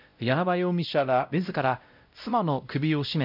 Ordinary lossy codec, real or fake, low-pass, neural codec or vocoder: none; fake; 5.4 kHz; codec, 16 kHz, 0.5 kbps, X-Codec, WavLM features, trained on Multilingual LibriSpeech